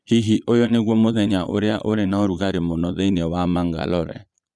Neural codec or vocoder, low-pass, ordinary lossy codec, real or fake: vocoder, 22.05 kHz, 80 mel bands, Vocos; none; none; fake